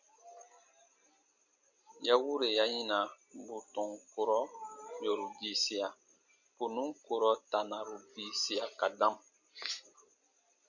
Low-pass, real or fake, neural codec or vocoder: 7.2 kHz; real; none